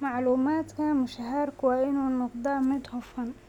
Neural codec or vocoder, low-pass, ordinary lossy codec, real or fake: none; 19.8 kHz; none; real